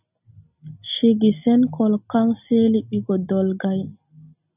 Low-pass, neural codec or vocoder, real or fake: 3.6 kHz; none; real